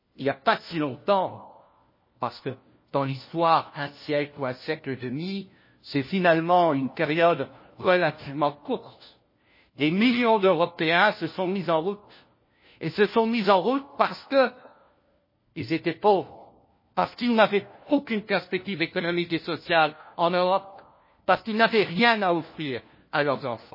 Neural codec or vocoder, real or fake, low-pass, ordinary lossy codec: codec, 16 kHz, 1 kbps, FunCodec, trained on LibriTTS, 50 frames a second; fake; 5.4 kHz; MP3, 24 kbps